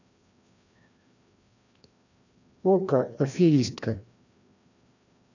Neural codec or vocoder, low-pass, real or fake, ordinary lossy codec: codec, 16 kHz, 1 kbps, FreqCodec, larger model; 7.2 kHz; fake; none